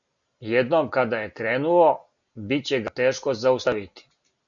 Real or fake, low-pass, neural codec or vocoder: real; 7.2 kHz; none